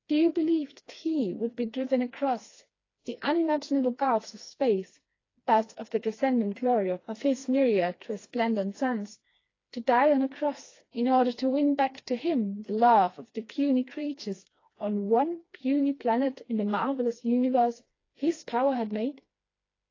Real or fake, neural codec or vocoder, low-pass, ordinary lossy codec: fake; codec, 16 kHz, 2 kbps, FreqCodec, smaller model; 7.2 kHz; AAC, 32 kbps